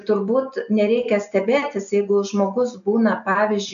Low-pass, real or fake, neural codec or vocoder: 7.2 kHz; real; none